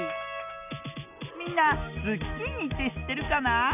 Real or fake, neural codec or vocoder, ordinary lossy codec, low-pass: real; none; none; 3.6 kHz